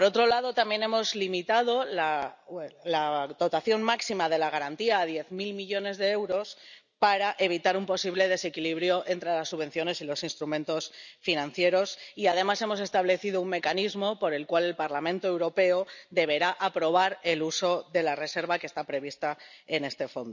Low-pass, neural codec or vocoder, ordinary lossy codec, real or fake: 7.2 kHz; none; none; real